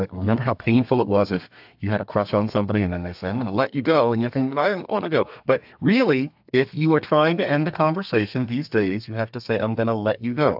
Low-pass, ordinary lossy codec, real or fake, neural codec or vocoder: 5.4 kHz; AAC, 48 kbps; fake; codec, 32 kHz, 1.9 kbps, SNAC